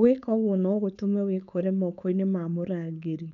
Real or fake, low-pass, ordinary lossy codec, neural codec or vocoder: fake; 7.2 kHz; none; codec, 16 kHz, 4.8 kbps, FACodec